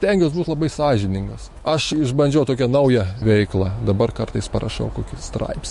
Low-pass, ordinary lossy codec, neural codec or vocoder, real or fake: 14.4 kHz; MP3, 48 kbps; autoencoder, 48 kHz, 128 numbers a frame, DAC-VAE, trained on Japanese speech; fake